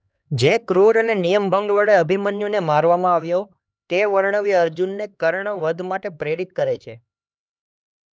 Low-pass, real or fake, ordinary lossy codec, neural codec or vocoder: none; fake; none; codec, 16 kHz, 2 kbps, X-Codec, HuBERT features, trained on LibriSpeech